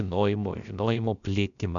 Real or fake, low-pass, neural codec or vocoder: fake; 7.2 kHz; codec, 16 kHz, about 1 kbps, DyCAST, with the encoder's durations